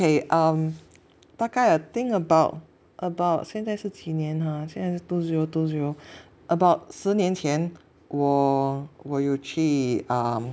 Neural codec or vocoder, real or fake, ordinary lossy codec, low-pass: none; real; none; none